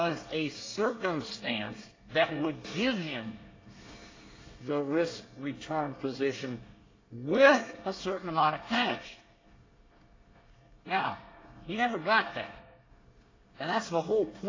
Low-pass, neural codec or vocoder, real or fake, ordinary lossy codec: 7.2 kHz; codec, 24 kHz, 1 kbps, SNAC; fake; AAC, 32 kbps